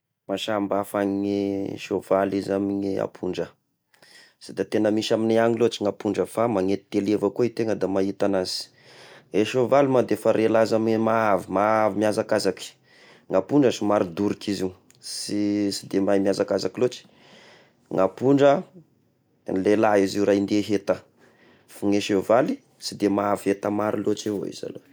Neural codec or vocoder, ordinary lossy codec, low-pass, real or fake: none; none; none; real